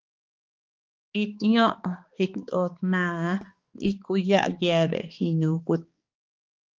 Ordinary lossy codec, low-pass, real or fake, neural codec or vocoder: Opus, 24 kbps; 7.2 kHz; fake; codec, 16 kHz, 2 kbps, X-Codec, HuBERT features, trained on balanced general audio